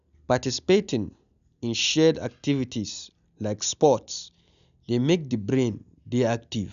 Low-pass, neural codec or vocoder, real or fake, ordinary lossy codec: 7.2 kHz; none; real; none